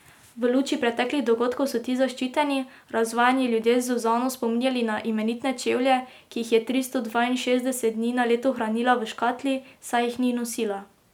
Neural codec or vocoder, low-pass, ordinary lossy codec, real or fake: none; 19.8 kHz; none; real